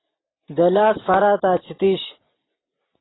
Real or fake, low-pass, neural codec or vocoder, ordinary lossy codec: real; 7.2 kHz; none; AAC, 16 kbps